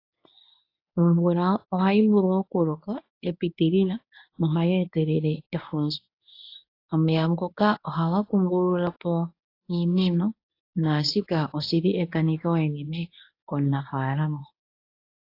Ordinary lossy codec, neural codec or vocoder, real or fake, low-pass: AAC, 32 kbps; codec, 24 kHz, 0.9 kbps, WavTokenizer, medium speech release version 1; fake; 5.4 kHz